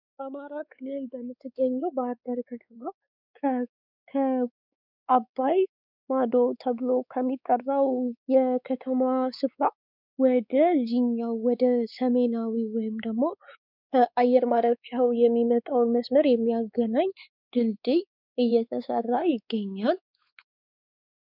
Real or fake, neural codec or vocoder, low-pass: fake; codec, 16 kHz, 4 kbps, X-Codec, WavLM features, trained on Multilingual LibriSpeech; 5.4 kHz